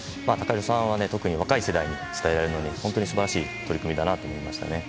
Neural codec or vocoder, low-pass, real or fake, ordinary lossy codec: none; none; real; none